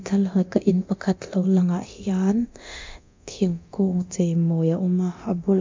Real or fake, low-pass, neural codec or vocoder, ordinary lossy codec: fake; 7.2 kHz; codec, 24 kHz, 0.9 kbps, DualCodec; MP3, 64 kbps